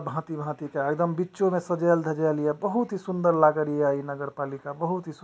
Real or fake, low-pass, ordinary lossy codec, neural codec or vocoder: real; none; none; none